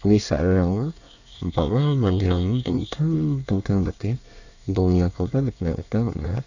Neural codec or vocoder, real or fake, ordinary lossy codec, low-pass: codec, 24 kHz, 1 kbps, SNAC; fake; none; 7.2 kHz